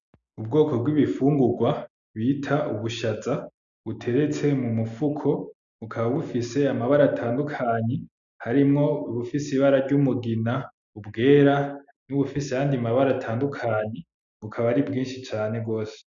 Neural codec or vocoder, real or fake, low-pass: none; real; 7.2 kHz